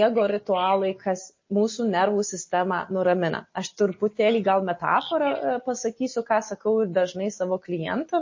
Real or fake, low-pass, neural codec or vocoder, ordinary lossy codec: real; 7.2 kHz; none; MP3, 32 kbps